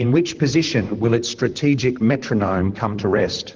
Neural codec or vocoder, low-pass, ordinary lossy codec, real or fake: vocoder, 44.1 kHz, 128 mel bands, Pupu-Vocoder; 7.2 kHz; Opus, 16 kbps; fake